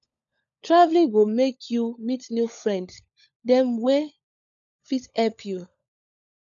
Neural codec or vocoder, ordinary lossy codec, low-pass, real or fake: codec, 16 kHz, 16 kbps, FunCodec, trained on LibriTTS, 50 frames a second; none; 7.2 kHz; fake